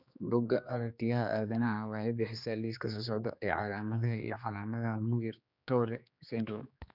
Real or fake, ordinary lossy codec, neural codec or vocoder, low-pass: fake; none; codec, 16 kHz, 2 kbps, X-Codec, HuBERT features, trained on general audio; 5.4 kHz